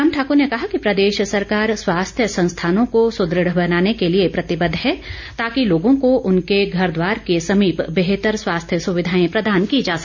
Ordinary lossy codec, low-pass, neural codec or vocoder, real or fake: none; 7.2 kHz; none; real